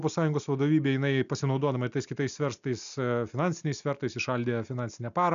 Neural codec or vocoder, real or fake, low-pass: none; real; 7.2 kHz